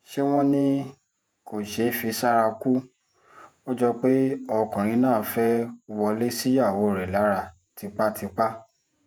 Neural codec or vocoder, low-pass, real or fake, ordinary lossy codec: vocoder, 48 kHz, 128 mel bands, Vocos; 19.8 kHz; fake; none